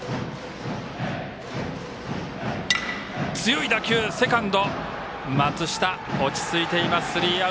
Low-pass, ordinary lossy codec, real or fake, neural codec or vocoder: none; none; real; none